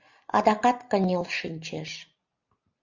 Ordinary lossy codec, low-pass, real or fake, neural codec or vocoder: Opus, 64 kbps; 7.2 kHz; real; none